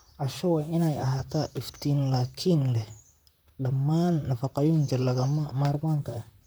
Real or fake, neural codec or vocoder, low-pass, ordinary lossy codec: fake; vocoder, 44.1 kHz, 128 mel bands, Pupu-Vocoder; none; none